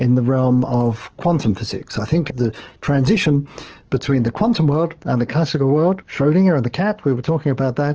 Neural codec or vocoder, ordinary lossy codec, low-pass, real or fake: codec, 44.1 kHz, 7.8 kbps, DAC; Opus, 16 kbps; 7.2 kHz; fake